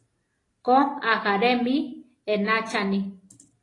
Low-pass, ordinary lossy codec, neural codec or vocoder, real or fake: 10.8 kHz; AAC, 32 kbps; none; real